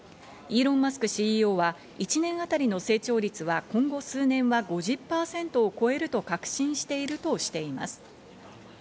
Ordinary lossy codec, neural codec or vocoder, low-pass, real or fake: none; none; none; real